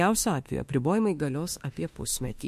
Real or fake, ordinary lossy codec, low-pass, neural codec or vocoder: fake; MP3, 64 kbps; 14.4 kHz; autoencoder, 48 kHz, 32 numbers a frame, DAC-VAE, trained on Japanese speech